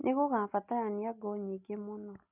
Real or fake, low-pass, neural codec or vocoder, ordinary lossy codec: real; 3.6 kHz; none; none